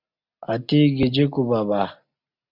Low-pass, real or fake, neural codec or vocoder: 5.4 kHz; real; none